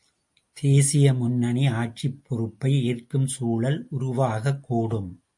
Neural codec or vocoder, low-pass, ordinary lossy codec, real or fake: none; 10.8 kHz; MP3, 48 kbps; real